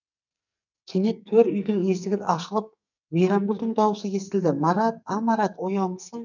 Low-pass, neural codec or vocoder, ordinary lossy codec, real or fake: 7.2 kHz; codec, 44.1 kHz, 2.6 kbps, SNAC; none; fake